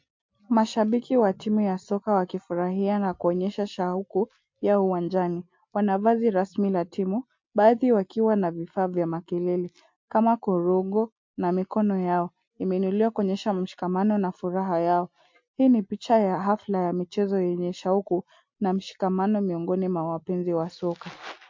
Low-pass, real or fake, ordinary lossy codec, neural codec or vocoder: 7.2 kHz; real; MP3, 48 kbps; none